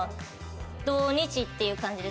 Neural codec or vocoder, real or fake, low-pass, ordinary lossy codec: none; real; none; none